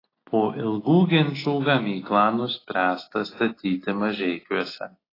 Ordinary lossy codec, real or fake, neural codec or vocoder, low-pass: AAC, 24 kbps; real; none; 5.4 kHz